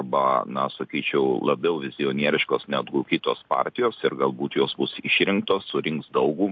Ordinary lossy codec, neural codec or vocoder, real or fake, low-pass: MP3, 48 kbps; none; real; 7.2 kHz